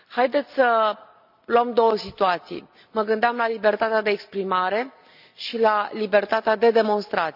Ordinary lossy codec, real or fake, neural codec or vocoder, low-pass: none; real; none; 5.4 kHz